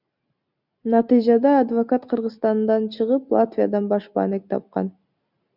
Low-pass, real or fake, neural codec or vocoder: 5.4 kHz; real; none